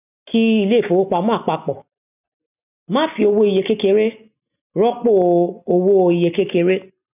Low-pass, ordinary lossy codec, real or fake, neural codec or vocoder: 3.6 kHz; none; real; none